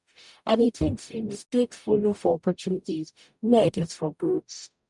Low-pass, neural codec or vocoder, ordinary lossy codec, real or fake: 10.8 kHz; codec, 44.1 kHz, 0.9 kbps, DAC; none; fake